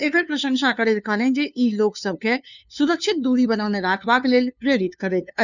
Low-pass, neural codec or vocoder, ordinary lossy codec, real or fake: 7.2 kHz; codec, 16 kHz, 2 kbps, FunCodec, trained on LibriTTS, 25 frames a second; none; fake